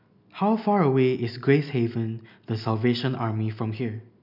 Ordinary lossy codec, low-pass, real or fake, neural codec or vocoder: none; 5.4 kHz; real; none